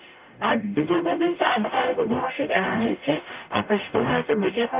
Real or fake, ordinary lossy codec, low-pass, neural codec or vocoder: fake; Opus, 24 kbps; 3.6 kHz; codec, 44.1 kHz, 0.9 kbps, DAC